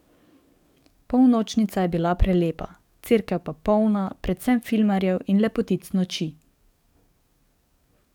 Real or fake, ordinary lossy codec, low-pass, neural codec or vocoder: fake; none; 19.8 kHz; codec, 44.1 kHz, 7.8 kbps, DAC